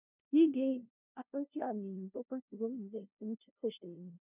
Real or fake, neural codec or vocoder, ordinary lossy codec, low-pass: fake; codec, 16 kHz, 0.5 kbps, FunCodec, trained on LibriTTS, 25 frames a second; none; 3.6 kHz